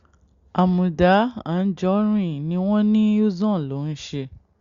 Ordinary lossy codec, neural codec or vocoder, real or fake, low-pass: Opus, 64 kbps; none; real; 7.2 kHz